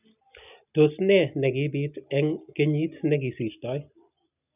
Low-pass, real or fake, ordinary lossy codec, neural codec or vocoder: 3.6 kHz; real; none; none